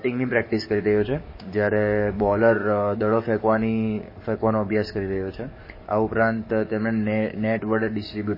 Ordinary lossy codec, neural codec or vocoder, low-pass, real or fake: MP3, 24 kbps; codec, 44.1 kHz, 7.8 kbps, DAC; 5.4 kHz; fake